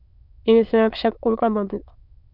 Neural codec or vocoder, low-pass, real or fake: autoencoder, 22.05 kHz, a latent of 192 numbers a frame, VITS, trained on many speakers; 5.4 kHz; fake